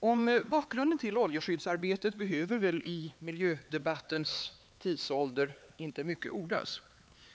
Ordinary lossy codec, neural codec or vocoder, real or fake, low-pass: none; codec, 16 kHz, 4 kbps, X-Codec, HuBERT features, trained on LibriSpeech; fake; none